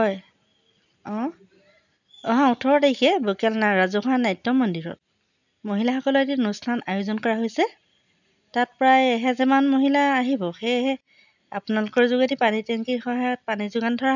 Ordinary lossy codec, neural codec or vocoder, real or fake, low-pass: none; none; real; 7.2 kHz